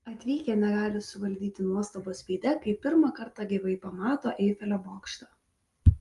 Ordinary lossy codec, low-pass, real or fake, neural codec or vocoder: Opus, 32 kbps; 10.8 kHz; real; none